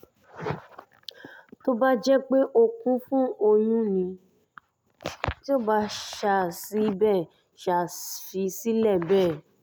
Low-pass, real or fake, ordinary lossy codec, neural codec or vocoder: none; real; none; none